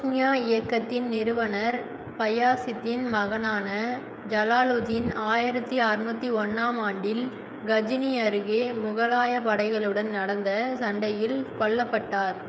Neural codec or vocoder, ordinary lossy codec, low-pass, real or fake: codec, 16 kHz, 8 kbps, FreqCodec, smaller model; none; none; fake